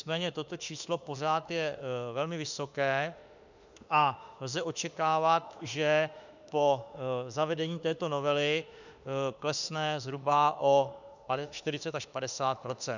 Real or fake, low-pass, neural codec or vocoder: fake; 7.2 kHz; autoencoder, 48 kHz, 32 numbers a frame, DAC-VAE, trained on Japanese speech